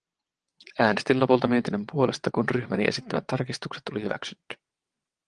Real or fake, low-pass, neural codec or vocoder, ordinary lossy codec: fake; 9.9 kHz; vocoder, 22.05 kHz, 80 mel bands, WaveNeXt; Opus, 24 kbps